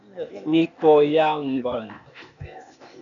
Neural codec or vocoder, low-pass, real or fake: codec, 16 kHz, 0.8 kbps, ZipCodec; 7.2 kHz; fake